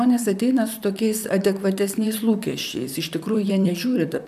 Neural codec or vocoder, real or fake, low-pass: vocoder, 44.1 kHz, 128 mel bands, Pupu-Vocoder; fake; 14.4 kHz